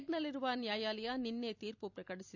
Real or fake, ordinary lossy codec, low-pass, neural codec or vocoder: fake; none; 7.2 kHz; vocoder, 44.1 kHz, 128 mel bands every 256 samples, BigVGAN v2